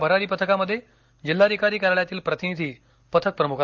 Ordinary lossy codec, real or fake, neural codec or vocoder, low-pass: Opus, 24 kbps; real; none; 7.2 kHz